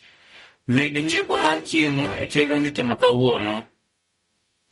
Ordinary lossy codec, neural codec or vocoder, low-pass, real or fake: MP3, 48 kbps; codec, 44.1 kHz, 0.9 kbps, DAC; 19.8 kHz; fake